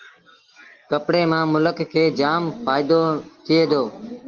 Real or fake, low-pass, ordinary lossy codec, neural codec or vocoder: real; 7.2 kHz; Opus, 16 kbps; none